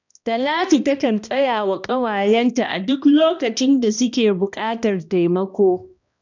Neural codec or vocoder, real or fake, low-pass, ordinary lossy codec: codec, 16 kHz, 1 kbps, X-Codec, HuBERT features, trained on balanced general audio; fake; 7.2 kHz; none